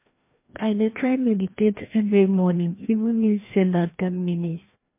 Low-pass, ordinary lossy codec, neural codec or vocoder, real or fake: 3.6 kHz; MP3, 24 kbps; codec, 16 kHz, 1 kbps, FreqCodec, larger model; fake